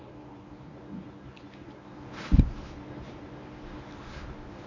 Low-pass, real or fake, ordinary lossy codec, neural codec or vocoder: 7.2 kHz; fake; none; codec, 24 kHz, 0.9 kbps, WavTokenizer, medium speech release version 1